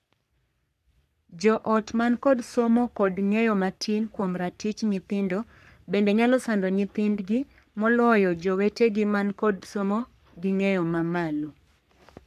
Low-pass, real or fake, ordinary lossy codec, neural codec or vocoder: 14.4 kHz; fake; none; codec, 44.1 kHz, 3.4 kbps, Pupu-Codec